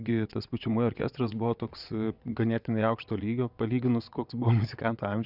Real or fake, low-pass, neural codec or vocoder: fake; 5.4 kHz; vocoder, 22.05 kHz, 80 mel bands, WaveNeXt